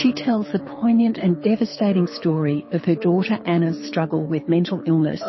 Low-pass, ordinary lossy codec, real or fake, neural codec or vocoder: 7.2 kHz; MP3, 24 kbps; fake; codec, 24 kHz, 6 kbps, HILCodec